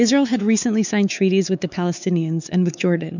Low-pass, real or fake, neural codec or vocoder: 7.2 kHz; fake; codec, 16 kHz, 4 kbps, X-Codec, WavLM features, trained on Multilingual LibriSpeech